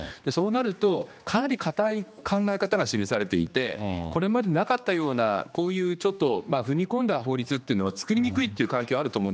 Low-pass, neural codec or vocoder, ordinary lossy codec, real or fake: none; codec, 16 kHz, 2 kbps, X-Codec, HuBERT features, trained on general audio; none; fake